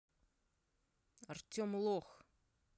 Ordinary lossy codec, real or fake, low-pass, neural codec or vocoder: none; real; none; none